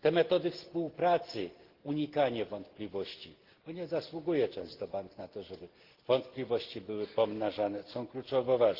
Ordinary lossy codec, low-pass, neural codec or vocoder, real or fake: Opus, 16 kbps; 5.4 kHz; none; real